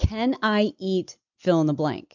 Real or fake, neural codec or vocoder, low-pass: real; none; 7.2 kHz